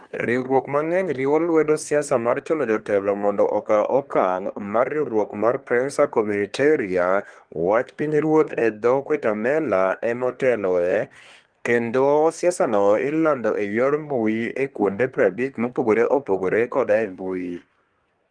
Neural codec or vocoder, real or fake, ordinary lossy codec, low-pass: codec, 24 kHz, 1 kbps, SNAC; fake; Opus, 24 kbps; 9.9 kHz